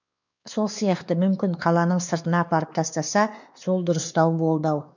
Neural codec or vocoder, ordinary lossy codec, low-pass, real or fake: codec, 16 kHz, 2 kbps, X-Codec, WavLM features, trained on Multilingual LibriSpeech; none; 7.2 kHz; fake